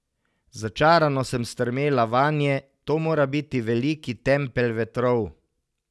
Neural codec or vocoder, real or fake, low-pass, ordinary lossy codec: none; real; none; none